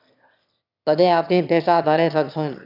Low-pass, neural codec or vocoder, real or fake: 5.4 kHz; autoencoder, 22.05 kHz, a latent of 192 numbers a frame, VITS, trained on one speaker; fake